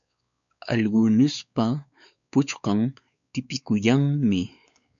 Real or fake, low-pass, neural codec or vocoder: fake; 7.2 kHz; codec, 16 kHz, 4 kbps, X-Codec, WavLM features, trained on Multilingual LibriSpeech